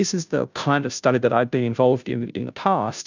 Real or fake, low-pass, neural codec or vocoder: fake; 7.2 kHz; codec, 16 kHz, 0.5 kbps, FunCodec, trained on Chinese and English, 25 frames a second